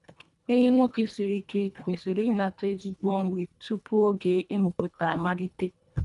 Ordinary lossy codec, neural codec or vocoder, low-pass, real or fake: none; codec, 24 kHz, 1.5 kbps, HILCodec; 10.8 kHz; fake